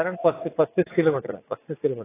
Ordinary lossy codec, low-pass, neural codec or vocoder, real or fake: AAC, 16 kbps; 3.6 kHz; vocoder, 44.1 kHz, 80 mel bands, Vocos; fake